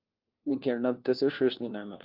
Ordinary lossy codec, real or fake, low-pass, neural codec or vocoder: Opus, 24 kbps; fake; 5.4 kHz; codec, 16 kHz, 1 kbps, FunCodec, trained on LibriTTS, 50 frames a second